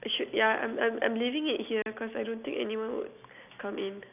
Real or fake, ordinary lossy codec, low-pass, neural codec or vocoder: real; none; 3.6 kHz; none